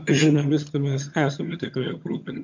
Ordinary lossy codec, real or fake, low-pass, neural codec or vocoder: MP3, 48 kbps; fake; 7.2 kHz; vocoder, 22.05 kHz, 80 mel bands, HiFi-GAN